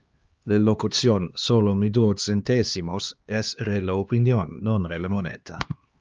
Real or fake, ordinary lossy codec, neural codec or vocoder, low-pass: fake; Opus, 24 kbps; codec, 16 kHz, 2 kbps, X-Codec, HuBERT features, trained on LibriSpeech; 7.2 kHz